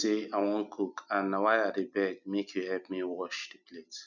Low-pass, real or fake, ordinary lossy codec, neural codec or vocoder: 7.2 kHz; real; none; none